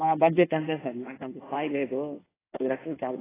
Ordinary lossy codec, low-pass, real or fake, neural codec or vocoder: AAC, 16 kbps; 3.6 kHz; fake; codec, 16 kHz in and 24 kHz out, 1.1 kbps, FireRedTTS-2 codec